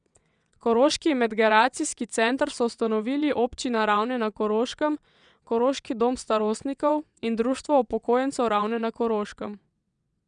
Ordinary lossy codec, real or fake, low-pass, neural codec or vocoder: none; fake; 9.9 kHz; vocoder, 22.05 kHz, 80 mel bands, WaveNeXt